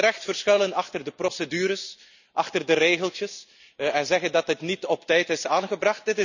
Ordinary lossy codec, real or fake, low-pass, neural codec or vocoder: none; real; 7.2 kHz; none